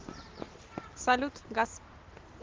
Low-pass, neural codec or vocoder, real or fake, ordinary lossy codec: 7.2 kHz; none; real; Opus, 16 kbps